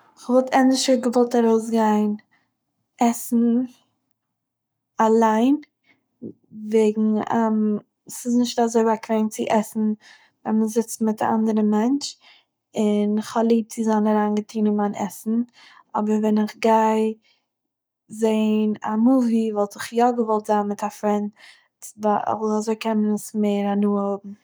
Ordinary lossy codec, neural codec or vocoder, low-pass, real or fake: none; codec, 44.1 kHz, 7.8 kbps, Pupu-Codec; none; fake